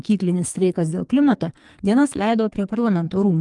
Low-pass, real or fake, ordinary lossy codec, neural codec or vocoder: 10.8 kHz; fake; Opus, 32 kbps; codec, 44.1 kHz, 2.6 kbps, SNAC